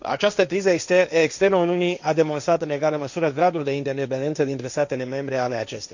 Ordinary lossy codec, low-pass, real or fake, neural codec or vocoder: none; 7.2 kHz; fake; codec, 16 kHz, 1.1 kbps, Voila-Tokenizer